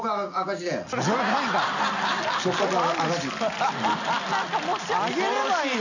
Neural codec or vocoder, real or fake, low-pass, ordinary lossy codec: none; real; 7.2 kHz; none